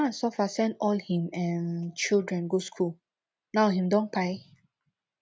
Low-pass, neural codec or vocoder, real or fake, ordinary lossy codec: none; none; real; none